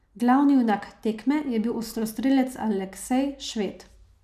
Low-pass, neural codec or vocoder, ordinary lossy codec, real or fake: 14.4 kHz; none; none; real